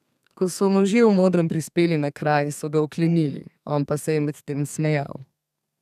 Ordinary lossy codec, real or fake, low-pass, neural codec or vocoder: none; fake; 14.4 kHz; codec, 32 kHz, 1.9 kbps, SNAC